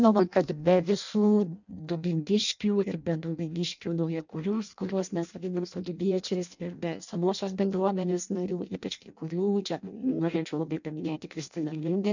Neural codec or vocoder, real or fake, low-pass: codec, 16 kHz in and 24 kHz out, 0.6 kbps, FireRedTTS-2 codec; fake; 7.2 kHz